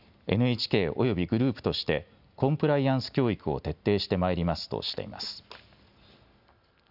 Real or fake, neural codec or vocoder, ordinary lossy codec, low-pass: real; none; none; 5.4 kHz